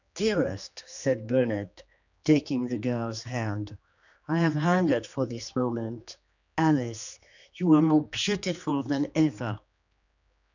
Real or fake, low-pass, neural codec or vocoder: fake; 7.2 kHz; codec, 16 kHz, 2 kbps, X-Codec, HuBERT features, trained on general audio